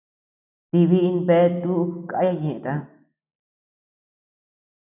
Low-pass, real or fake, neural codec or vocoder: 3.6 kHz; real; none